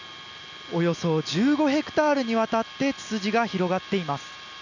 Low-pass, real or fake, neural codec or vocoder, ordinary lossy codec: 7.2 kHz; real; none; none